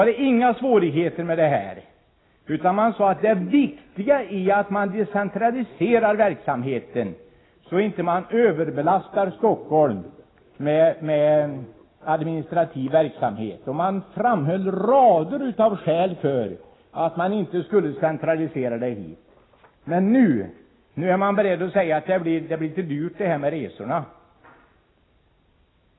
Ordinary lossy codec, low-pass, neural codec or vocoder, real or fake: AAC, 16 kbps; 7.2 kHz; none; real